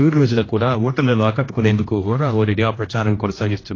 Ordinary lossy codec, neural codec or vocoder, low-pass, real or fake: AAC, 32 kbps; codec, 16 kHz, 1 kbps, X-Codec, HuBERT features, trained on general audio; 7.2 kHz; fake